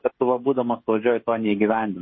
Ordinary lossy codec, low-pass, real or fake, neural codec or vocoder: MP3, 24 kbps; 7.2 kHz; fake; codec, 16 kHz, 16 kbps, FreqCodec, smaller model